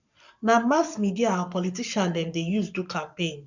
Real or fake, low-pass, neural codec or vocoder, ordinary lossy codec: fake; 7.2 kHz; codec, 44.1 kHz, 7.8 kbps, Pupu-Codec; none